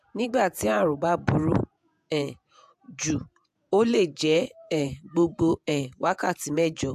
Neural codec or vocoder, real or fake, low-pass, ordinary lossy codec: vocoder, 48 kHz, 128 mel bands, Vocos; fake; 14.4 kHz; AAC, 96 kbps